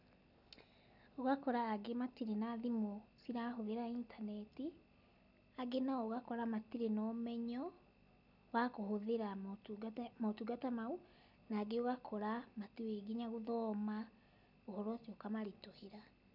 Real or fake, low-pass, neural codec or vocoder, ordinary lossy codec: real; 5.4 kHz; none; none